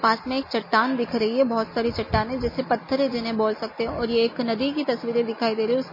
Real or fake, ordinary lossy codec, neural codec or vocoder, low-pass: real; MP3, 24 kbps; none; 5.4 kHz